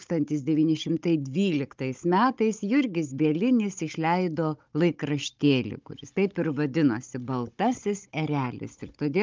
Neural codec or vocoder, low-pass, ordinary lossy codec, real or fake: codec, 16 kHz, 16 kbps, FunCodec, trained on Chinese and English, 50 frames a second; 7.2 kHz; Opus, 24 kbps; fake